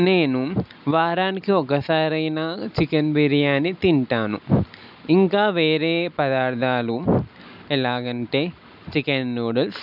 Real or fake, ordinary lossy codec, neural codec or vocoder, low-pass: real; none; none; 5.4 kHz